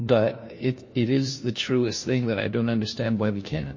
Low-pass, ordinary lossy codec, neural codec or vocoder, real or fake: 7.2 kHz; MP3, 32 kbps; codec, 16 kHz, 1 kbps, FunCodec, trained on LibriTTS, 50 frames a second; fake